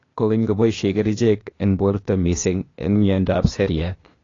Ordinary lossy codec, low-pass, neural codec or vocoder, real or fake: AAC, 32 kbps; 7.2 kHz; codec, 16 kHz, 0.8 kbps, ZipCodec; fake